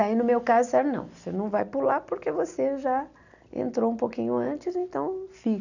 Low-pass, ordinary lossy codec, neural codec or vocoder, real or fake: 7.2 kHz; none; none; real